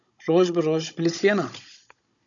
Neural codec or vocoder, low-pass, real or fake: codec, 16 kHz, 16 kbps, FunCodec, trained on Chinese and English, 50 frames a second; 7.2 kHz; fake